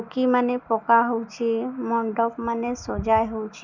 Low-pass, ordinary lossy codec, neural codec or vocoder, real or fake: 7.2 kHz; none; none; real